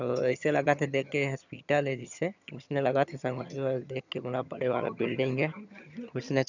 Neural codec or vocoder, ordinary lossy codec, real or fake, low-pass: vocoder, 22.05 kHz, 80 mel bands, HiFi-GAN; none; fake; 7.2 kHz